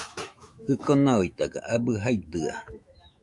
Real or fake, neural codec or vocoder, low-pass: fake; autoencoder, 48 kHz, 128 numbers a frame, DAC-VAE, trained on Japanese speech; 10.8 kHz